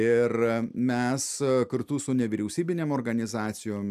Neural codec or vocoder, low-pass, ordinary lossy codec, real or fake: none; 14.4 kHz; AAC, 96 kbps; real